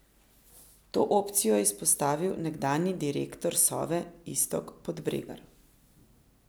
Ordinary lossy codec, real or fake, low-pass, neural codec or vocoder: none; real; none; none